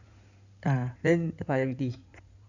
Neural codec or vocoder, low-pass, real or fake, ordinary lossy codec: codec, 16 kHz in and 24 kHz out, 2.2 kbps, FireRedTTS-2 codec; 7.2 kHz; fake; none